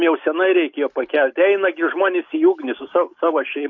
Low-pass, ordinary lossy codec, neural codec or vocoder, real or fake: 7.2 kHz; AAC, 48 kbps; none; real